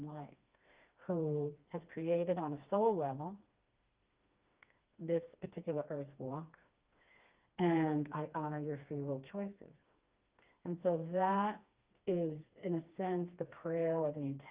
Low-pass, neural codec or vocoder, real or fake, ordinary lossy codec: 3.6 kHz; codec, 16 kHz, 2 kbps, FreqCodec, smaller model; fake; Opus, 32 kbps